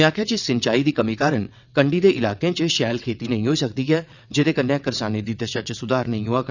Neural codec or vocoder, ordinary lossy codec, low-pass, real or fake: vocoder, 22.05 kHz, 80 mel bands, WaveNeXt; none; 7.2 kHz; fake